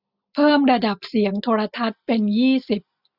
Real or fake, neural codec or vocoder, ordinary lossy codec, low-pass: real; none; none; 5.4 kHz